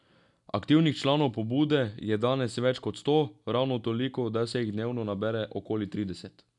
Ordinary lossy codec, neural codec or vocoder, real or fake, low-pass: none; none; real; 10.8 kHz